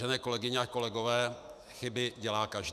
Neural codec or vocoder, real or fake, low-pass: autoencoder, 48 kHz, 128 numbers a frame, DAC-VAE, trained on Japanese speech; fake; 14.4 kHz